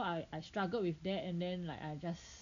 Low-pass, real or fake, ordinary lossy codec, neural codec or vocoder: 7.2 kHz; real; none; none